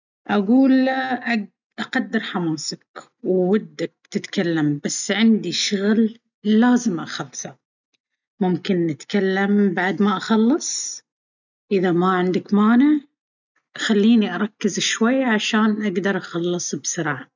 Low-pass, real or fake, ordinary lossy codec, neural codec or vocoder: 7.2 kHz; real; none; none